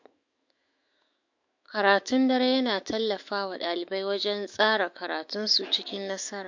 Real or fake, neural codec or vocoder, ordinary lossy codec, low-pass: fake; autoencoder, 48 kHz, 128 numbers a frame, DAC-VAE, trained on Japanese speech; MP3, 48 kbps; 7.2 kHz